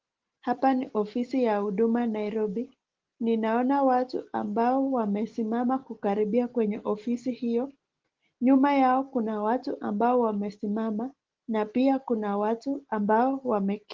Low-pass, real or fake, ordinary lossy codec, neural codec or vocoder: 7.2 kHz; real; Opus, 16 kbps; none